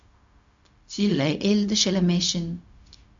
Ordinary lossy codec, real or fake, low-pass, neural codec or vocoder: MP3, 64 kbps; fake; 7.2 kHz; codec, 16 kHz, 0.4 kbps, LongCat-Audio-Codec